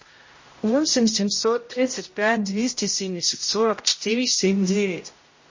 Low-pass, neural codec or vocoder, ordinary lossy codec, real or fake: 7.2 kHz; codec, 16 kHz, 0.5 kbps, X-Codec, HuBERT features, trained on general audio; MP3, 32 kbps; fake